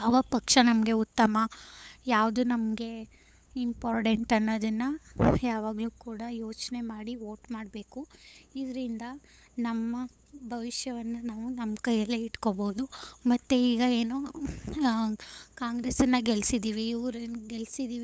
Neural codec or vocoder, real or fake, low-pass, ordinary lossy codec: codec, 16 kHz, 8 kbps, FunCodec, trained on LibriTTS, 25 frames a second; fake; none; none